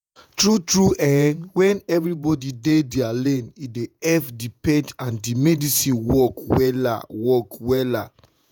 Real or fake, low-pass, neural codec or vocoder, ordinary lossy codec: fake; none; vocoder, 48 kHz, 128 mel bands, Vocos; none